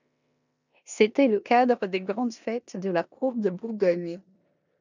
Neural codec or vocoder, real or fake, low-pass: codec, 16 kHz in and 24 kHz out, 0.9 kbps, LongCat-Audio-Codec, four codebook decoder; fake; 7.2 kHz